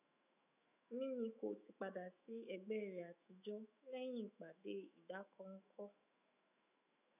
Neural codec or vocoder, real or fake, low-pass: autoencoder, 48 kHz, 128 numbers a frame, DAC-VAE, trained on Japanese speech; fake; 3.6 kHz